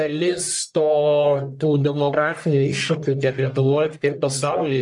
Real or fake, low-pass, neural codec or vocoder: fake; 10.8 kHz; codec, 44.1 kHz, 1.7 kbps, Pupu-Codec